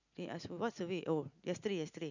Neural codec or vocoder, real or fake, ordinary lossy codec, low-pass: none; real; none; 7.2 kHz